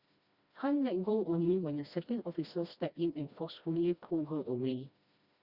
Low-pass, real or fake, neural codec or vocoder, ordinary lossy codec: 5.4 kHz; fake; codec, 16 kHz, 1 kbps, FreqCodec, smaller model; Opus, 64 kbps